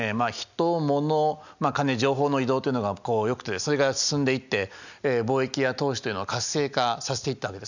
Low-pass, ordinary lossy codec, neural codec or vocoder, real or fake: 7.2 kHz; none; none; real